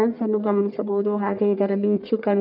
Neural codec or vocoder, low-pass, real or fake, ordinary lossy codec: codec, 44.1 kHz, 1.7 kbps, Pupu-Codec; 5.4 kHz; fake; none